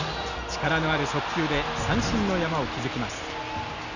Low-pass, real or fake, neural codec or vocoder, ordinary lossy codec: 7.2 kHz; real; none; none